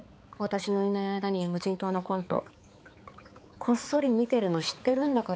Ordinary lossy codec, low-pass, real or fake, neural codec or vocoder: none; none; fake; codec, 16 kHz, 4 kbps, X-Codec, HuBERT features, trained on balanced general audio